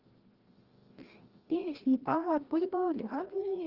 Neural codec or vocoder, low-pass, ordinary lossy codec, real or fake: codec, 16 kHz, 1 kbps, FreqCodec, larger model; 5.4 kHz; Opus, 16 kbps; fake